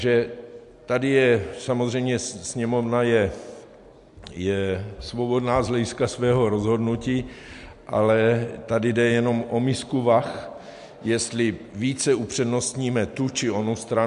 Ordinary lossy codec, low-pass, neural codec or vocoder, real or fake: MP3, 64 kbps; 10.8 kHz; none; real